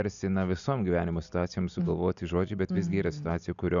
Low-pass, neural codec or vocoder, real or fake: 7.2 kHz; none; real